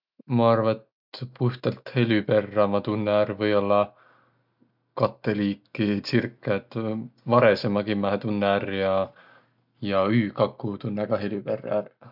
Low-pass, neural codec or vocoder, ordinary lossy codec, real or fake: 5.4 kHz; none; none; real